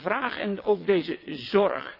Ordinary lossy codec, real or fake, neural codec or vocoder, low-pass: none; fake; vocoder, 22.05 kHz, 80 mel bands, WaveNeXt; 5.4 kHz